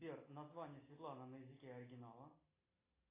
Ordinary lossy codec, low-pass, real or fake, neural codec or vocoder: AAC, 16 kbps; 3.6 kHz; real; none